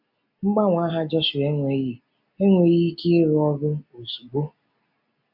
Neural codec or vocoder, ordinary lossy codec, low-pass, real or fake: none; MP3, 48 kbps; 5.4 kHz; real